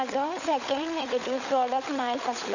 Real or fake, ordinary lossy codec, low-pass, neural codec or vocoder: fake; none; 7.2 kHz; codec, 16 kHz, 16 kbps, FunCodec, trained on LibriTTS, 50 frames a second